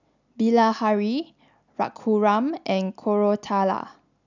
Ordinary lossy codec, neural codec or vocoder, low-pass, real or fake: none; none; 7.2 kHz; real